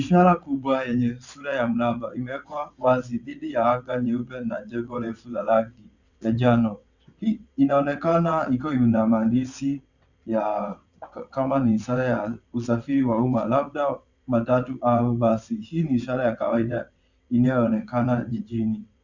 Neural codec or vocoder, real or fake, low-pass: vocoder, 22.05 kHz, 80 mel bands, WaveNeXt; fake; 7.2 kHz